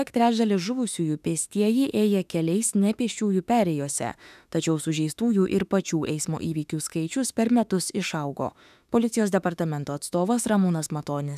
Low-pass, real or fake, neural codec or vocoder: 14.4 kHz; fake; autoencoder, 48 kHz, 32 numbers a frame, DAC-VAE, trained on Japanese speech